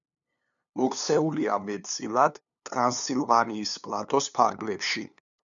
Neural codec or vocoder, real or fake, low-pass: codec, 16 kHz, 2 kbps, FunCodec, trained on LibriTTS, 25 frames a second; fake; 7.2 kHz